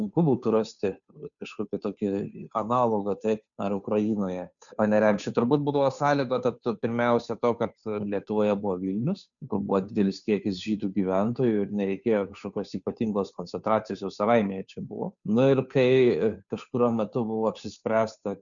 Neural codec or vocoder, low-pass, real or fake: codec, 16 kHz, 2 kbps, FunCodec, trained on Chinese and English, 25 frames a second; 7.2 kHz; fake